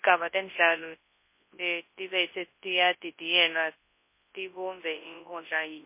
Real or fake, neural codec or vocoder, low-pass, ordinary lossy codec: fake; codec, 24 kHz, 0.9 kbps, WavTokenizer, large speech release; 3.6 kHz; MP3, 24 kbps